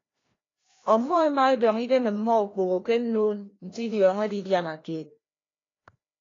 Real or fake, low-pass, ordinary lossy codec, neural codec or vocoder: fake; 7.2 kHz; AAC, 32 kbps; codec, 16 kHz, 1 kbps, FreqCodec, larger model